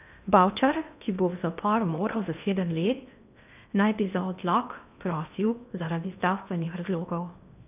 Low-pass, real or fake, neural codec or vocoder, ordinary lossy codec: 3.6 kHz; fake; codec, 16 kHz in and 24 kHz out, 0.8 kbps, FocalCodec, streaming, 65536 codes; none